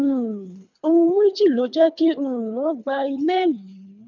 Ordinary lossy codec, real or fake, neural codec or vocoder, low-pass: none; fake; codec, 24 kHz, 3 kbps, HILCodec; 7.2 kHz